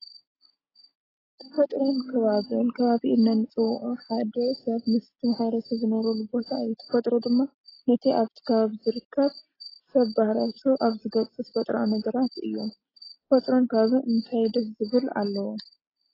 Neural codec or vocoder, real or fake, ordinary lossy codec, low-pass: none; real; AAC, 24 kbps; 5.4 kHz